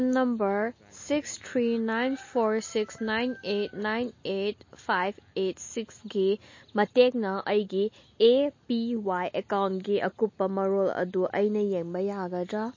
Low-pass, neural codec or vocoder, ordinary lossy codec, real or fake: 7.2 kHz; none; MP3, 32 kbps; real